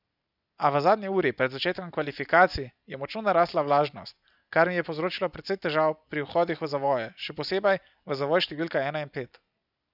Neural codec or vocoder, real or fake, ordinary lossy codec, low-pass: none; real; none; 5.4 kHz